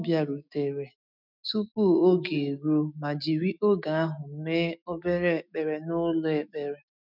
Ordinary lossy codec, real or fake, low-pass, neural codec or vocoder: none; fake; 5.4 kHz; autoencoder, 48 kHz, 128 numbers a frame, DAC-VAE, trained on Japanese speech